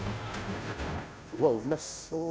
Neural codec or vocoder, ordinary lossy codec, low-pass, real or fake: codec, 16 kHz, 0.5 kbps, FunCodec, trained on Chinese and English, 25 frames a second; none; none; fake